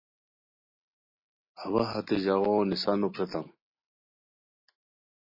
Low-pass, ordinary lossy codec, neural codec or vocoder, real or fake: 5.4 kHz; MP3, 24 kbps; none; real